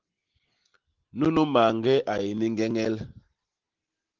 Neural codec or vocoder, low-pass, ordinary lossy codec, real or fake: none; 7.2 kHz; Opus, 16 kbps; real